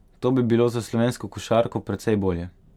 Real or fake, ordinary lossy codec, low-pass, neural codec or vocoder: real; none; 19.8 kHz; none